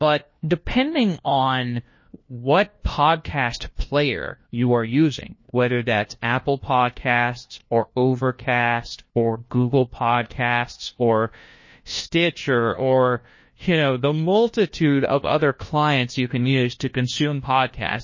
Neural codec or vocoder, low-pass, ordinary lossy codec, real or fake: codec, 16 kHz, 1 kbps, FunCodec, trained on LibriTTS, 50 frames a second; 7.2 kHz; MP3, 32 kbps; fake